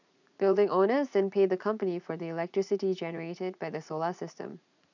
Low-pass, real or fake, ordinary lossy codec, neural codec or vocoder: 7.2 kHz; fake; none; vocoder, 44.1 kHz, 80 mel bands, Vocos